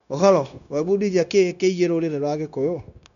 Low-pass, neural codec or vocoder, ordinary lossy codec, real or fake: 7.2 kHz; codec, 16 kHz, 0.9 kbps, LongCat-Audio-Codec; none; fake